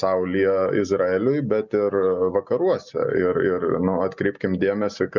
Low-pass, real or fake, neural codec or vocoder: 7.2 kHz; real; none